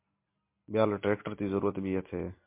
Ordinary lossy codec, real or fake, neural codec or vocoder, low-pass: MP3, 24 kbps; real; none; 3.6 kHz